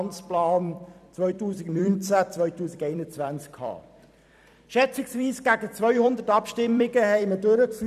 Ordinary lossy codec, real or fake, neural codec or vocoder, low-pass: none; fake; vocoder, 44.1 kHz, 128 mel bands every 256 samples, BigVGAN v2; 14.4 kHz